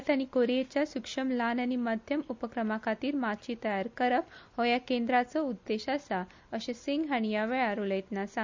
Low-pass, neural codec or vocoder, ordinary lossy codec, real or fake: 7.2 kHz; none; MP3, 64 kbps; real